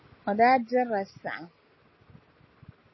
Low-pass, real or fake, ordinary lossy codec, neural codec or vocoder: 7.2 kHz; real; MP3, 24 kbps; none